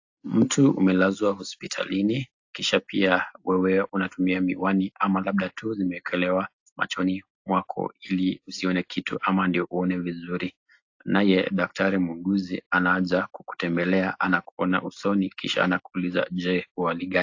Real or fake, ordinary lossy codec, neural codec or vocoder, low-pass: real; AAC, 48 kbps; none; 7.2 kHz